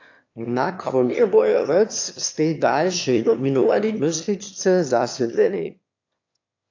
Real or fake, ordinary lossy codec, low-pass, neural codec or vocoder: fake; AAC, 48 kbps; 7.2 kHz; autoencoder, 22.05 kHz, a latent of 192 numbers a frame, VITS, trained on one speaker